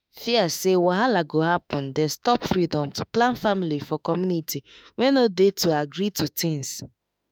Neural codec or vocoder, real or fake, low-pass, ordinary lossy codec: autoencoder, 48 kHz, 32 numbers a frame, DAC-VAE, trained on Japanese speech; fake; none; none